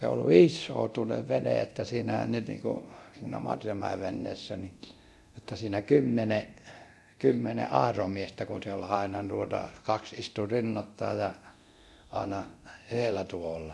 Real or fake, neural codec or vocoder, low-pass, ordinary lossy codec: fake; codec, 24 kHz, 0.9 kbps, DualCodec; none; none